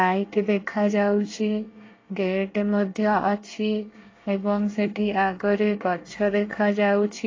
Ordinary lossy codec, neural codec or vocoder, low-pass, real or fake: AAC, 32 kbps; codec, 24 kHz, 1 kbps, SNAC; 7.2 kHz; fake